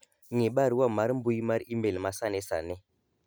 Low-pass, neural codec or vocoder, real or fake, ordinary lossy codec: none; none; real; none